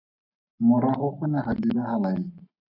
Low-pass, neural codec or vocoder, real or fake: 5.4 kHz; codec, 44.1 kHz, 7.8 kbps, Pupu-Codec; fake